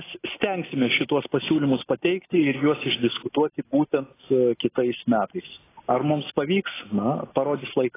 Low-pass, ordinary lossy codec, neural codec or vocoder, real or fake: 3.6 kHz; AAC, 16 kbps; none; real